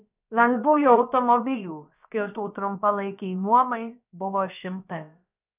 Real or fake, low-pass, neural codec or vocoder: fake; 3.6 kHz; codec, 16 kHz, about 1 kbps, DyCAST, with the encoder's durations